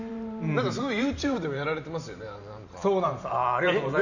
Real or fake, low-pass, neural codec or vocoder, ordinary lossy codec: fake; 7.2 kHz; vocoder, 44.1 kHz, 128 mel bands every 512 samples, BigVGAN v2; none